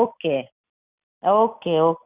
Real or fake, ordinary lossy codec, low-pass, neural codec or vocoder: real; Opus, 64 kbps; 3.6 kHz; none